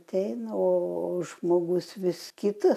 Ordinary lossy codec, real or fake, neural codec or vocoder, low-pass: AAC, 96 kbps; real; none; 14.4 kHz